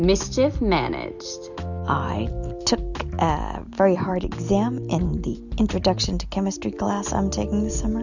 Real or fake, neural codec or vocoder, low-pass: real; none; 7.2 kHz